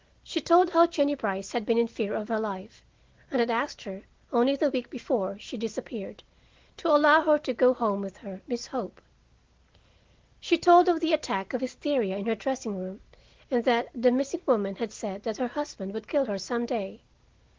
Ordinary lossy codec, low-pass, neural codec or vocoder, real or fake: Opus, 16 kbps; 7.2 kHz; none; real